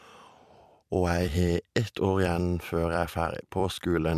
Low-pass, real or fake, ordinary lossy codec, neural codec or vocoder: 14.4 kHz; fake; MP3, 96 kbps; vocoder, 44.1 kHz, 128 mel bands every 256 samples, BigVGAN v2